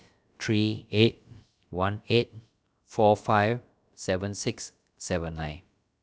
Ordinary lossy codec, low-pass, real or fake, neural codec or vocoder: none; none; fake; codec, 16 kHz, about 1 kbps, DyCAST, with the encoder's durations